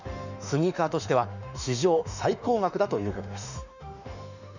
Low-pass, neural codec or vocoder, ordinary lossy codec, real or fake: 7.2 kHz; autoencoder, 48 kHz, 32 numbers a frame, DAC-VAE, trained on Japanese speech; none; fake